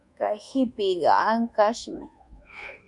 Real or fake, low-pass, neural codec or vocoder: fake; 10.8 kHz; codec, 24 kHz, 1.2 kbps, DualCodec